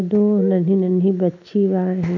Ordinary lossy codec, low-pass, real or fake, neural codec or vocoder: none; 7.2 kHz; real; none